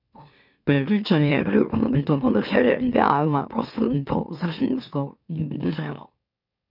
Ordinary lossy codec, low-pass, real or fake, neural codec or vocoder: none; 5.4 kHz; fake; autoencoder, 44.1 kHz, a latent of 192 numbers a frame, MeloTTS